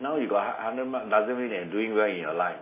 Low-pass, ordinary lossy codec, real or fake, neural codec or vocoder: 3.6 kHz; MP3, 16 kbps; real; none